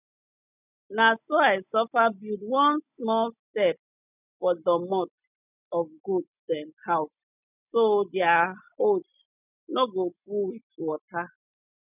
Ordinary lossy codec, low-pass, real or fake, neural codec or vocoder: none; 3.6 kHz; real; none